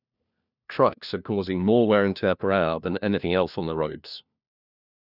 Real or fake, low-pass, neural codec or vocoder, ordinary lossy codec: fake; 5.4 kHz; codec, 16 kHz, 1 kbps, FunCodec, trained on LibriTTS, 50 frames a second; none